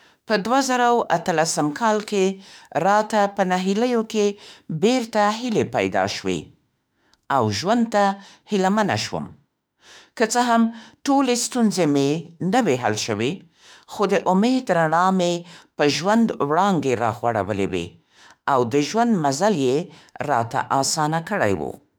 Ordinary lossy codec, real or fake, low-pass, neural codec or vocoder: none; fake; none; autoencoder, 48 kHz, 32 numbers a frame, DAC-VAE, trained on Japanese speech